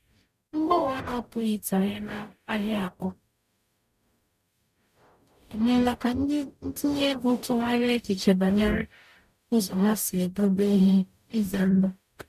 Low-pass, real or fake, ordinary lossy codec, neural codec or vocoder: 14.4 kHz; fake; none; codec, 44.1 kHz, 0.9 kbps, DAC